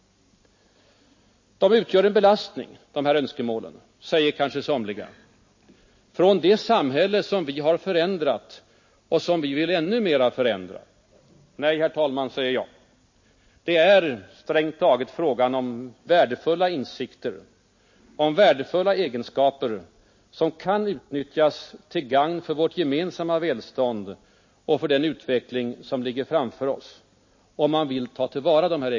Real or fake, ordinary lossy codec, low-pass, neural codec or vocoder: real; MP3, 32 kbps; 7.2 kHz; none